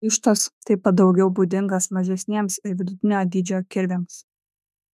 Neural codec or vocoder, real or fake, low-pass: autoencoder, 48 kHz, 32 numbers a frame, DAC-VAE, trained on Japanese speech; fake; 14.4 kHz